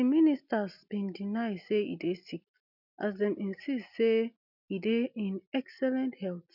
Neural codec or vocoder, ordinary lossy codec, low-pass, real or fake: none; none; 5.4 kHz; real